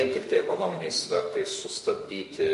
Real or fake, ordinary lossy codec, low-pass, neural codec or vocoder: fake; MP3, 48 kbps; 14.4 kHz; autoencoder, 48 kHz, 32 numbers a frame, DAC-VAE, trained on Japanese speech